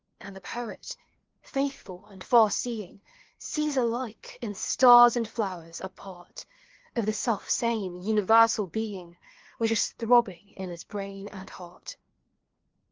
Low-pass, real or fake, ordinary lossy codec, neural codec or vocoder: 7.2 kHz; fake; Opus, 16 kbps; codec, 16 kHz, 2 kbps, FreqCodec, larger model